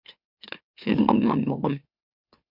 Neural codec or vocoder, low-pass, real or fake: autoencoder, 44.1 kHz, a latent of 192 numbers a frame, MeloTTS; 5.4 kHz; fake